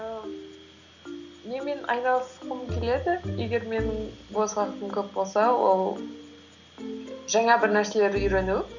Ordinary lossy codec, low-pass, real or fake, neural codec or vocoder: none; 7.2 kHz; real; none